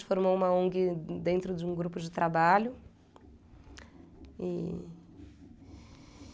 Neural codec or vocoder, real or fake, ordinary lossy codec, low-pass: none; real; none; none